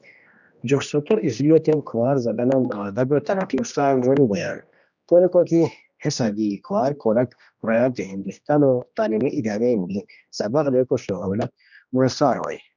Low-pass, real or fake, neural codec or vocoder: 7.2 kHz; fake; codec, 16 kHz, 1 kbps, X-Codec, HuBERT features, trained on general audio